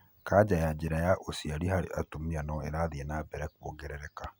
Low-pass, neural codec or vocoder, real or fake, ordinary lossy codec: none; none; real; none